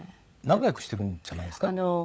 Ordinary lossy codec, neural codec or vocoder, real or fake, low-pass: none; codec, 16 kHz, 16 kbps, FunCodec, trained on LibriTTS, 50 frames a second; fake; none